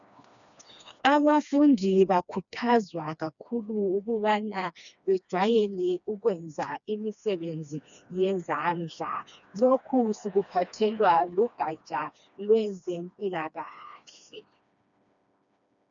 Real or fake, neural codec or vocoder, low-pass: fake; codec, 16 kHz, 2 kbps, FreqCodec, smaller model; 7.2 kHz